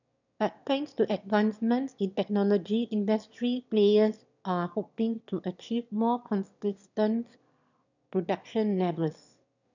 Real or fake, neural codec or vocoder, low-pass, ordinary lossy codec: fake; autoencoder, 22.05 kHz, a latent of 192 numbers a frame, VITS, trained on one speaker; 7.2 kHz; none